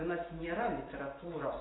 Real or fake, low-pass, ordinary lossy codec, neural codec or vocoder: real; 7.2 kHz; AAC, 16 kbps; none